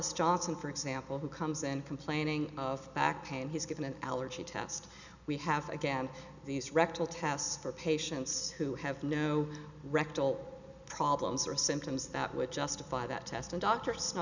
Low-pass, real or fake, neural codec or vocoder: 7.2 kHz; real; none